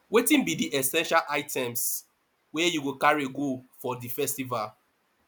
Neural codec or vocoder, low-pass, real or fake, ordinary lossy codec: none; none; real; none